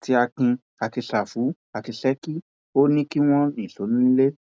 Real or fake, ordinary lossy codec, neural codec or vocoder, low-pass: real; none; none; none